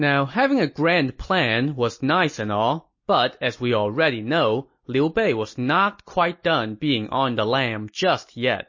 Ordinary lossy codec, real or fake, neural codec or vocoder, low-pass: MP3, 32 kbps; real; none; 7.2 kHz